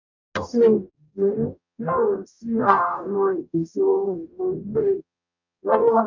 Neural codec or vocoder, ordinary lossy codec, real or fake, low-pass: codec, 44.1 kHz, 0.9 kbps, DAC; none; fake; 7.2 kHz